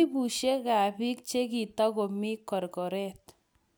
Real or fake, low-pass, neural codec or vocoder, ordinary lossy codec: real; none; none; none